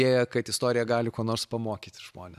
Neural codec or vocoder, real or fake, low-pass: none; real; 14.4 kHz